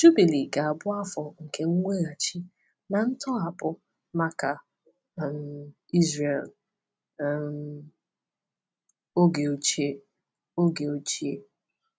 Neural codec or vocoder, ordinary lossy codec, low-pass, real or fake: none; none; none; real